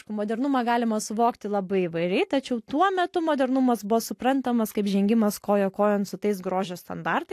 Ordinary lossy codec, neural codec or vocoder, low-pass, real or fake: AAC, 64 kbps; none; 14.4 kHz; real